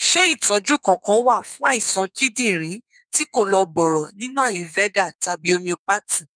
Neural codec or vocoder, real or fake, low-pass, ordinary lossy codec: codec, 32 kHz, 1.9 kbps, SNAC; fake; 9.9 kHz; none